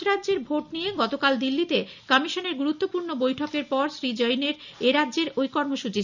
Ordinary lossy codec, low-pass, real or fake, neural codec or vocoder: none; 7.2 kHz; real; none